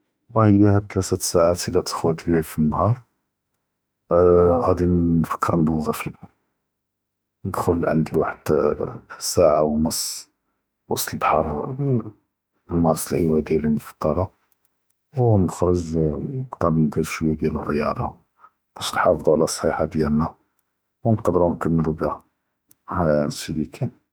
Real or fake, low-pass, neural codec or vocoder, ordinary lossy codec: fake; none; autoencoder, 48 kHz, 32 numbers a frame, DAC-VAE, trained on Japanese speech; none